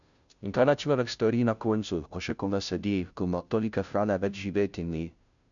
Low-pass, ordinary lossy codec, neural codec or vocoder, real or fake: 7.2 kHz; none; codec, 16 kHz, 0.5 kbps, FunCodec, trained on Chinese and English, 25 frames a second; fake